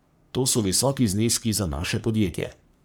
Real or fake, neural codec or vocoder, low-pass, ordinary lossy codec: fake; codec, 44.1 kHz, 3.4 kbps, Pupu-Codec; none; none